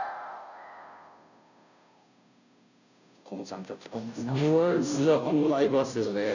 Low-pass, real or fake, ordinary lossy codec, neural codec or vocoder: 7.2 kHz; fake; none; codec, 16 kHz, 0.5 kbps, FunCodec, trained on Chinese and English, 25 frames a second